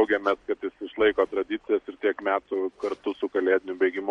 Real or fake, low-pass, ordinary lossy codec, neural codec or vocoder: real; 19.8 kHz; MP3, 48 kbps; none